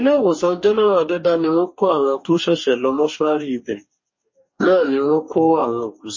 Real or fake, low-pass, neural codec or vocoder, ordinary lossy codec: fake; 7.2 kHz; codec, 44.1 kHz, 2.6 kbps, DAC; MP3, 32 kbps